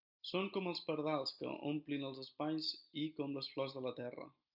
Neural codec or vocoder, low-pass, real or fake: none; 5.4 kHz; real